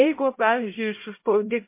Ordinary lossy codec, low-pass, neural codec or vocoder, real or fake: MP3, 24 kbps; 3.6 kHz; codec, 16 kHz, 0.5 kbps, X-Codec, HuBERT features, trained on LibriSpeech; fake